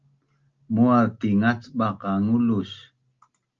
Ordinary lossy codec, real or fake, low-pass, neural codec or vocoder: Opus, 24 kbps; real; 7.2 kHz; none